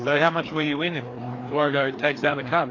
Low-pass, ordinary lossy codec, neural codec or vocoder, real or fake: 7.2 kHz; AAC, 32 kbps; codec, 16 kHz, 2 kbps, FreqCodec, larger model; fake